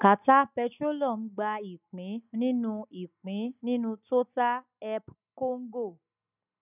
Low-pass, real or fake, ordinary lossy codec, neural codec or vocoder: 3.6 kHz; real; none; none